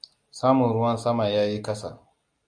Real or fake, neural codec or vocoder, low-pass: real; none; 9.9 kHz